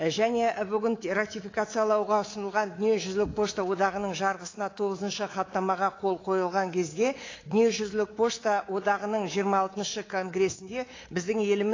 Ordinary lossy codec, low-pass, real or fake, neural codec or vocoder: AAC, 32 kbps; 7.2 kHz; fake; codec, 24 kHz, 3.1 kbps, DualCodec